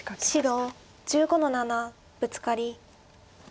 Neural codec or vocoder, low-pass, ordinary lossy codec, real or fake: none; none; none; real